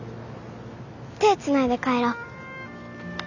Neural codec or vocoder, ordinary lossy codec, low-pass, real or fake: none; none; 7.2 kHz; real